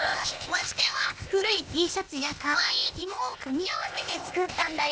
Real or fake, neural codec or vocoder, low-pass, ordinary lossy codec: fake; codec, 16 kHz, 0.8 kbps, ZipCodec; none; none